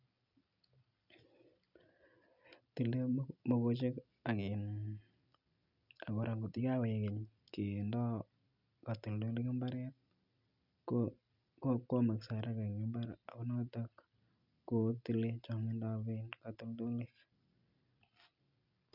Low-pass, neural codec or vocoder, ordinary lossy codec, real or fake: 5.4 kHz; none; none; real